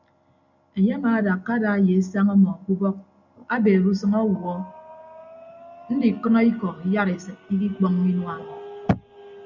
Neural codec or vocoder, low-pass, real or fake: none; 7.2 kHz; real